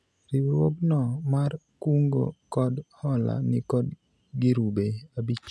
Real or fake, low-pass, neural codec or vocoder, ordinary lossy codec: real; none; none; none